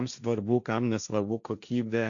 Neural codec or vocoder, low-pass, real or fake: codec, 16 kHz, 1.1 kbps, Voila-Tokenizer; 7.2 kHz; fake